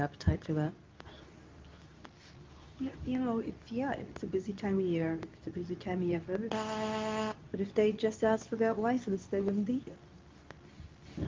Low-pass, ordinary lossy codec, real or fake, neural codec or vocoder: 7.2 kHz; Opus, 24 kbps; fake; codec, 24 kHz, 0.9 kbps, WavTokenizer, medium speech release version 2